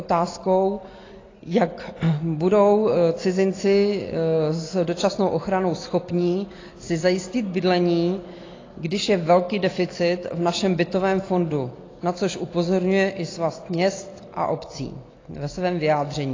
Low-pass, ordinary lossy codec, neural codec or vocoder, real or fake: 7.2 kHz; AAC, 32 kbps; none; real